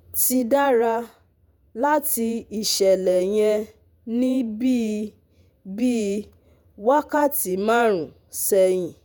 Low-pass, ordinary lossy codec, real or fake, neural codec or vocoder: none; none; fake; vocoder, 48 kHz, 128 mel bands, Vocos